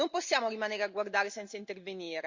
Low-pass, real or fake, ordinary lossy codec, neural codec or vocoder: 7.2 kHz; real; Opus, 64 kbps; none